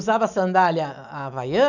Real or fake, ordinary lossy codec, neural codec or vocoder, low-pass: real; none; none; 7.2 kHz